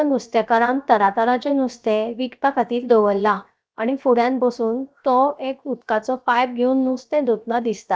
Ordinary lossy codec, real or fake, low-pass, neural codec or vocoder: none; fake; none; codec, 16 kHz, 0.7 kbps, FocalCodec